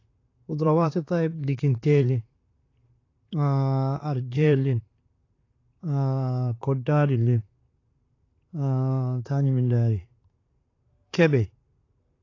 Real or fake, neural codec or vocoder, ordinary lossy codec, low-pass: fake; vocoder, 44.1 kHz, 128 mel bands, Pupu-Vocoder; AAC, 32 kbps; 7.2 kHz